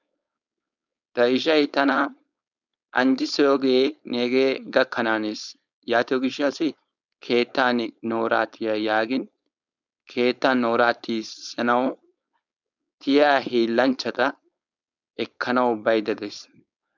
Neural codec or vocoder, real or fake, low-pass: codec, 16 kHz, 4.8 kbps, FACodec; fake; 7.2 kHz